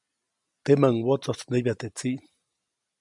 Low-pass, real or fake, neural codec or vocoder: 10.8 kHz; real; none